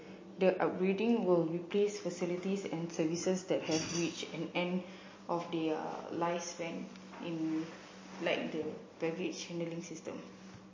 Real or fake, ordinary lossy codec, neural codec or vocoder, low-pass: real; MP3, 32 kbps; none; 7.2 kHz